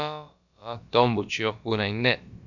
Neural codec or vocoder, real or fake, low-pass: codec, 16 kHz, about 1 kbps, DyCAST, with the encoder's durations; fake; 7.2 kHz